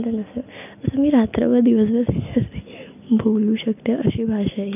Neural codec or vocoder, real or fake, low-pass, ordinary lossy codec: none; real; 3.6 kHz; none